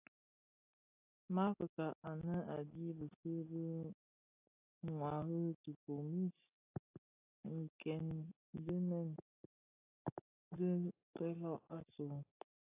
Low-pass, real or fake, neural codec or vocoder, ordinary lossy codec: 3.6 kHz; real; none; AAC, 24 kbps